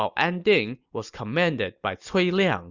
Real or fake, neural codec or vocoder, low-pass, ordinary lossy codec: real; none; 7.2 kHz; Opus, 64 kbps